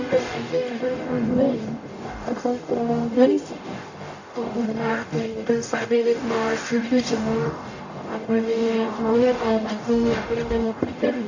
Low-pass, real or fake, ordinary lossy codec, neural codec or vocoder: 7.2 kHz; fake; AAC, 48 kbps; codec, 44.1 kHz, 0.9 kbps, DAC